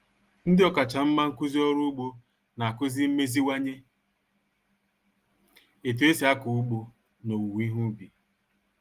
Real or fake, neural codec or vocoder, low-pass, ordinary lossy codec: real; none; 14.4 kHz; Opus, 24 kbps